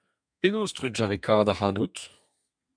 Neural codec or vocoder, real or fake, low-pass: codec, 32 kHz, 1.9 kbps, SNAC; fake; 9.9 kHz